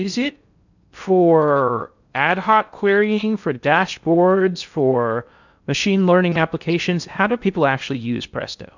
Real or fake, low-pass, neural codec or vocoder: fake; 7.2 kHz; codec, 16 kHz in and 24 kHz out, 0.6 kbps, FocalCodec, streaming, 2048 codes